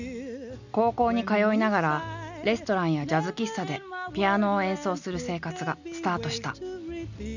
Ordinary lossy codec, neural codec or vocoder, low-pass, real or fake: none; none; 7.2 kHz; real